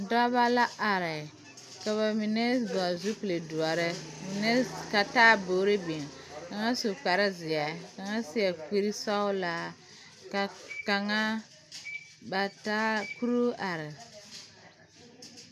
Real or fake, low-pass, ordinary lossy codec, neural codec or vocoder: real; 14.4 kHz; AAC, 96 kbps; none